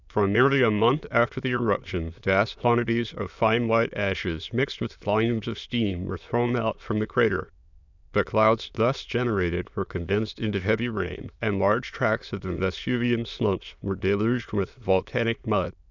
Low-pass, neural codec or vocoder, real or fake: 7.2 kHz; autoencoder, 22.05 kHz, a latent of 192 numbers a frame, VITS, trained on many speakers; fake